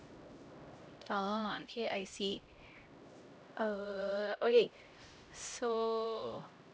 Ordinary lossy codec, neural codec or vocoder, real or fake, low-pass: none; codec, 16 kHz, 0.5 kbps, X-Codec, HuBERT features, trained on LibriSpeech; fake; none